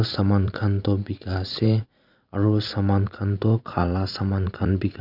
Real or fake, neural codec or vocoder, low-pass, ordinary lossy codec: fake; vocoder, 44.1 kHz, 80 mel bands, Vocos; 5.4 kHz; none